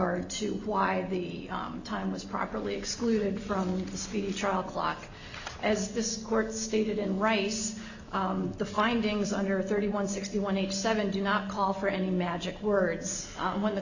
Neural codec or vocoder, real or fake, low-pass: none; real; 7.2 kHz